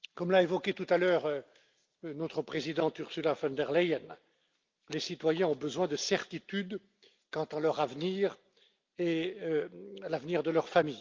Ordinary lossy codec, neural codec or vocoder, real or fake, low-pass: Opus, 32 kbps; none; real; 7.2 kHz